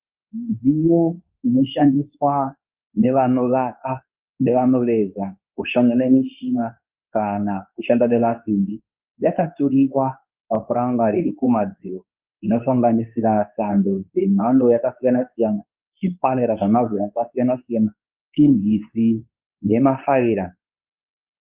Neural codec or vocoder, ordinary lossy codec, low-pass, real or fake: codec, 24 kHz, 0.9 kbps, WavTokenizer, medium speech release version 2; Opus, 24 kbps; 3.6 kHz; fake